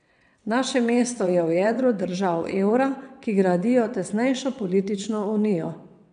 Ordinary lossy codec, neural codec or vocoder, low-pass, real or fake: none; vocoder, 22.05 kHz, 80 mel bands, WaveNeXt; 9.9 kHz; fake